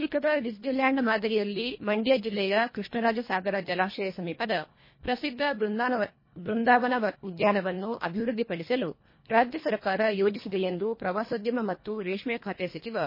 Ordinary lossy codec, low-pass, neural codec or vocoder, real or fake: MP3, 24 kbps; 5.4 kHz; codec, 24 kHz, 1.5 kbps, HILCodec; fake